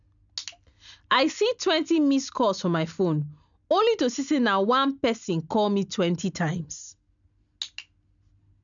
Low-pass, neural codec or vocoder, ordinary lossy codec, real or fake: 7.2 kHz; none; none; real